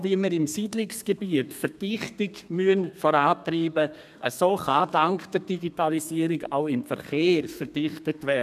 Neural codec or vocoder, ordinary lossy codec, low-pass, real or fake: codec, 32 kHz, 1.9 kbps, SNAC; none; 14.4 kHz; fake